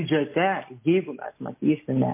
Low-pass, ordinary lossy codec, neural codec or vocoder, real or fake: 3.6 kHz; MP3, 24 kbps; none; real